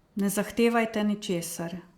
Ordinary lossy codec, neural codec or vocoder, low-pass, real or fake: Opus, 64 kbps; vocoder, 44.1 kHz, 128 mel bands every 256 samples, BigVGAN v2; 19.8 kHz; fake